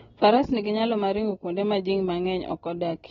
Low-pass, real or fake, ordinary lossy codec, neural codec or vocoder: 7.2 kHz; real; AAC, 24 kbps; none